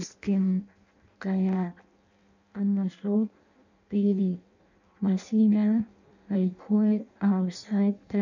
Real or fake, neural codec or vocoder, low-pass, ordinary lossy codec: fake; codec, 16 kHz in and 24 kHz out, 0.6 kbps, FireRedTTS-2 codec; 7.2 kHz; none